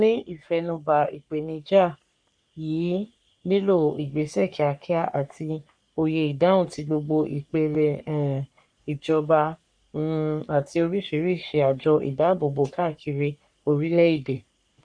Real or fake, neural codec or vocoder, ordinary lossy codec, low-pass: fake; codec, 44.1 kHz, 3.4 kbps, Pupu-Codec; none; 9.9 kHz